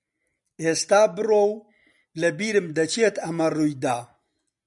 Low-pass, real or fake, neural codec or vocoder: 10.8 kHz; real; none